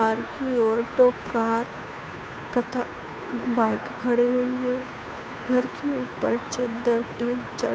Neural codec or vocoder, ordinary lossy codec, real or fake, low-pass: codec, 16 kHz, 2 kbps, FunCodec, trained on Chinese and English, 25 frames a second; none; fake; none